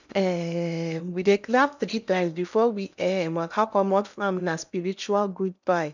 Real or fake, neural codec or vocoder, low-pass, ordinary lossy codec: fake; codec, 16 kHz in and 24 kHz out, 0.8 kbps, FocalCodec, streaming, 65536 codes; 7.2 kHz; none